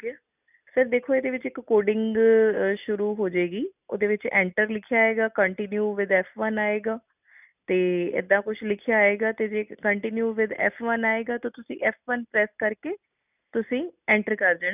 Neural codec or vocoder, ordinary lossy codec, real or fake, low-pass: none; none; real; 3.6 kHz